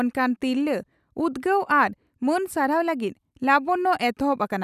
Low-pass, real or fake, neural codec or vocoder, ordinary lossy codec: 14.4 kHz; real; none; none